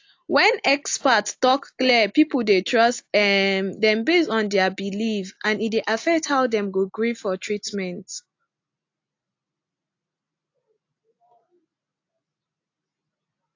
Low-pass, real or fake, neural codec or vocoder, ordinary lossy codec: 7.2 kHz; real; none; AAC, 48 kbps